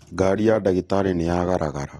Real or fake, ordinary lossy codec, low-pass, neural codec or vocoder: real; AAC, 32 kbps; 19.8 kHz; none